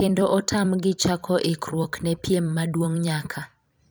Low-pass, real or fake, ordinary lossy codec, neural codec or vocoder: none; fake; none; vocoder, 44.1 kHz, 128 mel bands every 256 samples, BigVGAN v2